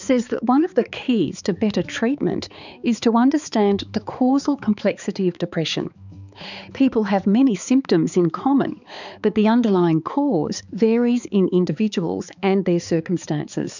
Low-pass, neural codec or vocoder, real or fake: 7.2 kHz; codec, 16 kHz, 4 kbps, X-Codec, HuBERT features, trained on balanced general audio; fake